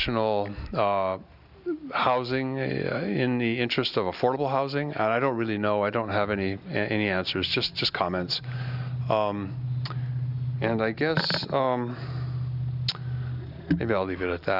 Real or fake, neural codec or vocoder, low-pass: real; none; 5.4 kHz